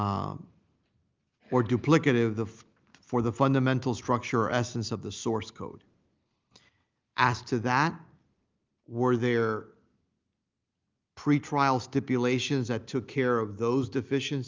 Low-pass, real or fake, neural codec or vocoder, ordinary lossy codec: 7.2 kHz; real; none; Opus, 24 kbps